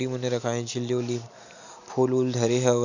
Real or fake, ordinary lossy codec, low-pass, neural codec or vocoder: real; none; 7.2 kHz; none